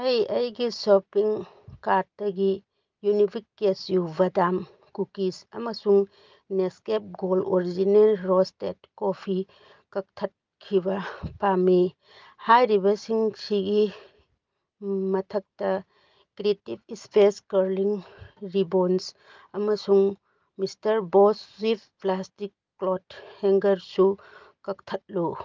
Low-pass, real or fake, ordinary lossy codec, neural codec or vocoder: 7.2 kHz; real; Opus, 24 kbps; none